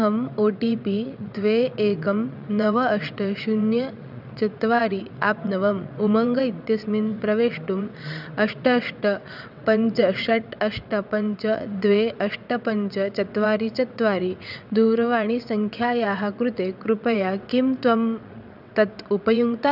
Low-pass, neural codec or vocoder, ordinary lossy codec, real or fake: 5.4 kHz; vocoder, 22.05 kHz, 80 mel bands, WaveNeXt; none; fake